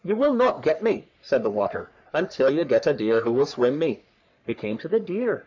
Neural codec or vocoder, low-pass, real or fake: codec, 44.1 kHz, 3.4 kbps, Pupu-Codec; 7.2 kHz; fake